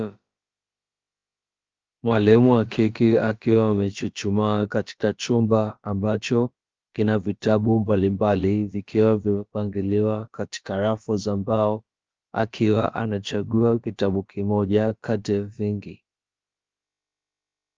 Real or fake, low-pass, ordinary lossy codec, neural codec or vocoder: fake; 7.2 kHz; Opus, 24 kbps; codec, 16 kHz, about 1 kbps, DyCAST, with the encoder's durations